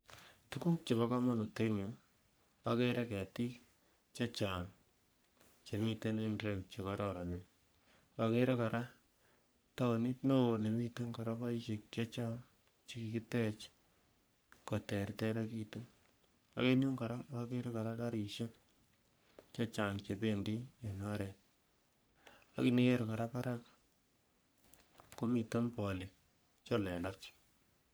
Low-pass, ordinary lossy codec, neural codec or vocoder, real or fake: none; none; codec, 44.1 kHz, 3.4 kbps, Pupu-Codec; fake